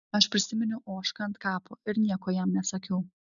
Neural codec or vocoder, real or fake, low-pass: none; real; 7.2 kHz